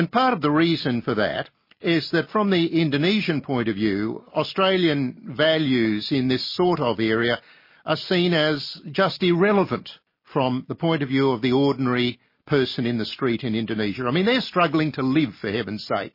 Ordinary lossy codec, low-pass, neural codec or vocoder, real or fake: MP3, 24 kbps; 5.4 kHz; none; real